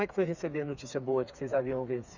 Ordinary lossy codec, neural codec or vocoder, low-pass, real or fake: none; codec, 16 kHz, 4 kbps, FreqCodec, smaller model; 7.2 kHz; fake